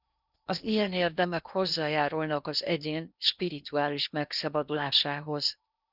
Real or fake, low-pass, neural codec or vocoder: fake; 5.4 kHz; codec, 16 kHz in and 24 kHz out, 0.8 kbps, FocalCodec, streaming, 65536 codes